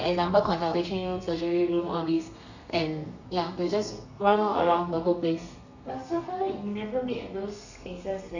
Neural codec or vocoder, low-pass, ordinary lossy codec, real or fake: codec, 32 kHz, 1.9 kbps, SNAC; 7.2 kHz; none; fake